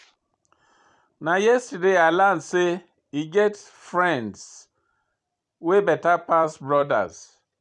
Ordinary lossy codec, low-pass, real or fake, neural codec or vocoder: none; 10.8 kHz; fake; vocoder, 44.1 kHz, 128 mel bands every 256 samples, BigVGAN v2